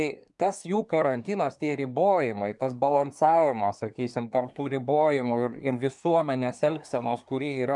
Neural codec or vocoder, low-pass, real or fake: codec, 24 kHz, 1 kbps, SNAC; 10.8 kHz; fake